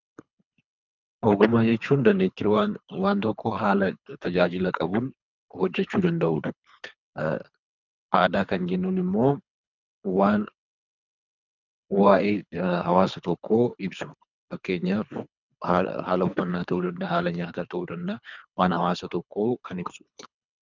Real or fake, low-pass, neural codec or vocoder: fake; 7.2 kHz; codec, 24 kHz, 3 kbps, HILCodec